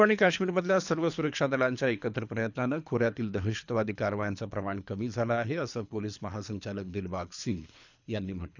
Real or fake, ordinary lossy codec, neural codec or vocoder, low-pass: fake; none; codec, 24 kHz, 3 kbps, HILCodec; 7.2 kHz